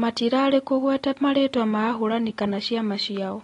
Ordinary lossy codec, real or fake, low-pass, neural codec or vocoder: AAC, 32 kbps; real; 10.8 kHz; none